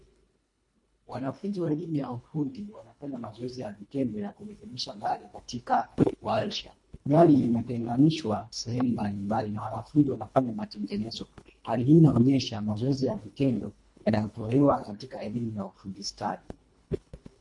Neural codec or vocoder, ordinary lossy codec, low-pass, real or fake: codec, 24 kHz, 1.5 kbps, HILCodec; MP3, 48 kbps; 10.8 kHz; fake